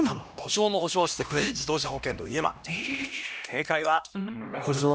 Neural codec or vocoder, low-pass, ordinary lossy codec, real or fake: codec, 16 kHz, 1 kbps, X-Codec, HuBERT features, trained on LibriSpeech; none; none; fake